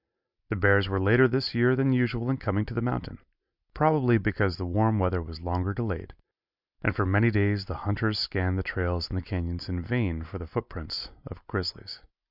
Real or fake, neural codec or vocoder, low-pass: real; none; 5.4 kHz